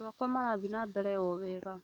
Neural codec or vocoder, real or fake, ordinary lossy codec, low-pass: codec, 44.1 kHz, 7.8 kbps, DAC; fake; none; 19.8 kHz